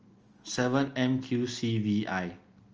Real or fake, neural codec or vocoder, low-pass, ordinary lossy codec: real; none; 7.2 kHz; Opus, 24 kbps